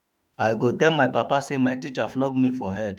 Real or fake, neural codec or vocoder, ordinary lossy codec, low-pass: fake; autoencoder, 48 kHz, 32 numbers a frame, DAC-VAE, trained on Japanese speech; none; 19.8 kHz